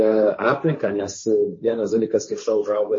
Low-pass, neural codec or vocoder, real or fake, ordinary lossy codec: 7.2 kHz; codec, 16 kHz, 1.1 kbps, Voila-Tokenizer; fake; MP3, 32 kbps